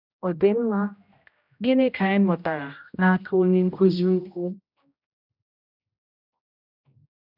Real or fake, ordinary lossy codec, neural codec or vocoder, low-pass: fake; none; codec, 16 kHz, 0.5 kbps, X-Codec, HuBERT features, trained on general audio; 5.4 kHz